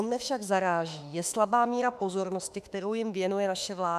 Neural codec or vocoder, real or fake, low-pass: autoencoder, 48 kHz, 32 numbers a frame, DAC-VAE, trained on Japanese speech; fake; 14.4 kHz